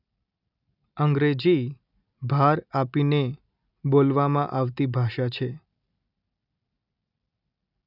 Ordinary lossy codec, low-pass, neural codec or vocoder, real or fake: none; 5.4 kHz; none; real